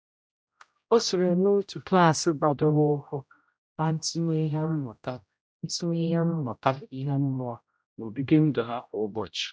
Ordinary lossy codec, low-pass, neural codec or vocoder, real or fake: none; none; codec, 16 kHz, 0.5 kbps, X-Codec, HuBERT features, trained on general audio; fake